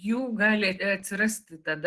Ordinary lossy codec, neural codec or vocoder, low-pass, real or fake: Opus, 16 kbps; none; 10.8 kHz; real